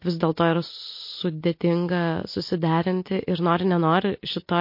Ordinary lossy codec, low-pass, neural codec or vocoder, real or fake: MP3, 32 kbps; 5.4 kHz; none; real